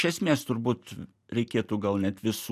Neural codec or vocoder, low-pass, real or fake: none; 14.4 kHz; real